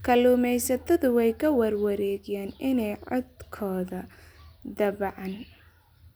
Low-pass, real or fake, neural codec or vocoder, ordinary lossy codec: none; real; none; none